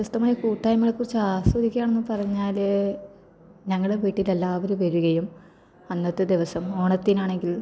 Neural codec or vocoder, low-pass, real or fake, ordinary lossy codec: none; none; real; none